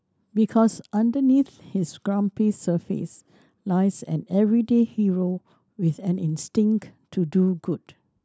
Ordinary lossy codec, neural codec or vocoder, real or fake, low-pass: none; none; real; none